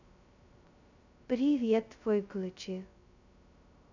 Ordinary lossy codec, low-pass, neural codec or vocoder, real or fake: none; 7.2 kHz; codec, 16 kHz, 0.2 kbps, FocalCodec; fake